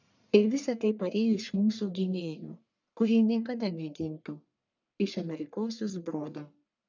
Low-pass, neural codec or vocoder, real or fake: 7.2 kHz; codec, 44.1 kHz, 1.7 kbps, Pupu-Codec; fake